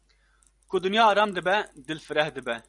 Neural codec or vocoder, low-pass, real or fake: none; 10.8 kHz; real